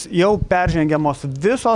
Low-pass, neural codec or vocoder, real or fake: 10.8 kHz; vocoder, 44.1 kHz, 128 mel bands every 256 samples, BigVGAN v2; fake